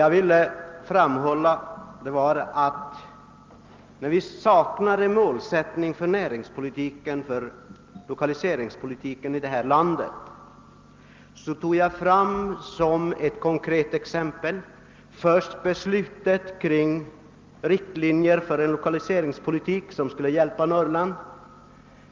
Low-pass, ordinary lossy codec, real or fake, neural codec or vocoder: 7.2 kHz; Opus, 32 kbps; real; none